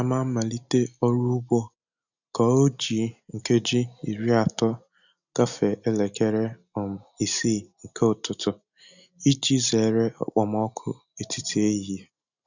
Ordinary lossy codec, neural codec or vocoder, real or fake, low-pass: none; none; real; 7.2 kHz